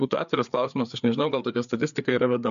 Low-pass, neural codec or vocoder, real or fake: 7.2 kHz; codec, 16 kHz, 4 kbps, FreqCodec, larger model; fake